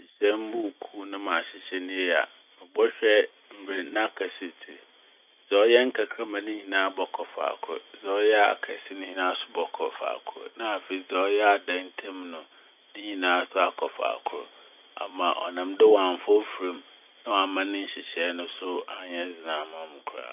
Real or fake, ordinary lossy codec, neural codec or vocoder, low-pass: real; none; none; 3.6 kHz